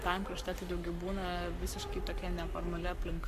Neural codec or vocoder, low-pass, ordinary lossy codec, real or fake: codec, 44.1 kHz, 7.8 kbps, Pupu-Codec; 14.4 kHz; MP3, 64 kbps; fake